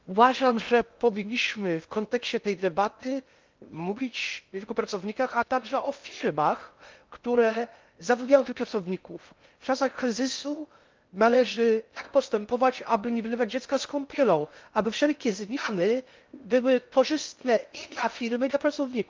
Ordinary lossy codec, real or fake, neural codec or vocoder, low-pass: Opus, 24 kbps; fake; codec, 16 kHz in and 24 kHz out, 0.6 kbps, FocalCodec, streaming, 2048 codes; 7.2 kHz